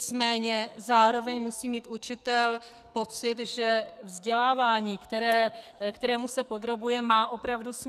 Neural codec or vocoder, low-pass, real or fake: codec, 44.1 kHz, 2.6 kbps, SNAC; 14.4 kHz; fake